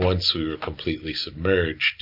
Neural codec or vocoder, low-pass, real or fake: none; 5.4 kHz; real